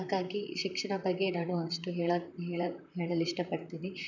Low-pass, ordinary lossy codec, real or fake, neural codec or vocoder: 7.2 kHz; none; fake; vocoder, 44.1 kHz, 128 mel bands, Pupu-Vocoder